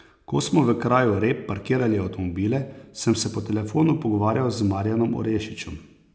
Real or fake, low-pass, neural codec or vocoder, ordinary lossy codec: real; none; none; none